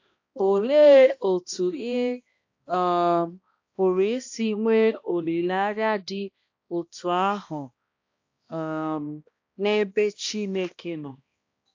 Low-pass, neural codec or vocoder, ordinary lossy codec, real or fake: 7.2 kHz; codec, 16 kHz, 1 kbps, X-Codec, HuBERT features, trained on balanced general audio; AAC, 48 kbps; fake